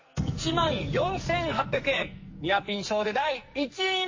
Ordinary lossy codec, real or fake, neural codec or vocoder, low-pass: MP3, 32 kbps; fake; codec, 44.1 kHz, 2.6 kbps, SNAC; 7.2 kHz